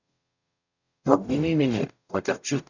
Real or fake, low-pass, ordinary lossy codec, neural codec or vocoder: fake; 7.2 kHz; none; codec, 44.1 kHz, 0.9 kbps, DAC